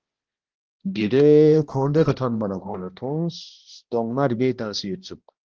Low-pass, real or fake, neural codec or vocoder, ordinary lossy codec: 7.2 kHz; fake; codec, 16 kHz, 1 kbps, X-Codec, HuBERT features, trained on balanced general audio; Opus, 16 kbps